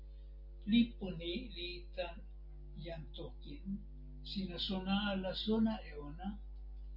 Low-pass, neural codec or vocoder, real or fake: 5.4 kHz; none; real